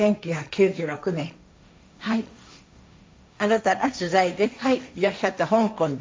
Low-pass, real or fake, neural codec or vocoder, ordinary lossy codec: none; fake; codec, 16 kHz, 1.1 kbps, Voila-Tokenizer; none